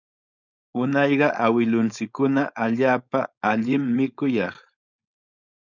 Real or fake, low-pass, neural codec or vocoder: fake; 7.2 kHz; codec, 16 kHz, 4.8 kbps, FACodec